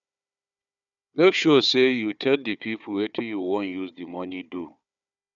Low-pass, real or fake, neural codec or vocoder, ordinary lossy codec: 7.2 kHz; fake; codec, 16 kHz, 4 kbps, FunCodec, trained on Chinese and English, 50 frames a second; none